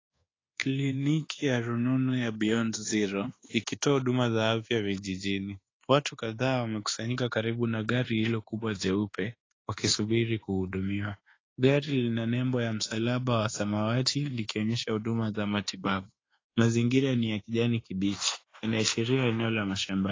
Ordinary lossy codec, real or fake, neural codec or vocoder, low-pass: AAC, 32 kbps; fake; codec, 24 kHz, 1.2 kbps, DualCodec; 7.2 kHz